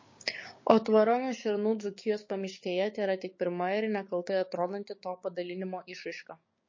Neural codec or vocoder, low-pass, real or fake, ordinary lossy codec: codec, 16 kHz, 6 kbps, DAC; 7.2 kHz; fake; MP3, 32 kbps